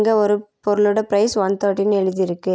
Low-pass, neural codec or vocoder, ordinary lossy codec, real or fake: none; none; none; real